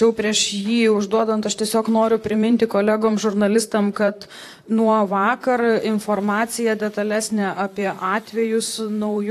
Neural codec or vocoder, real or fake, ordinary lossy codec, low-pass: vocoder, 44.1 kHz, 128 mel bands, Pupu-Vocoder; fake; AAC, 64 kbps; 14.4 kHz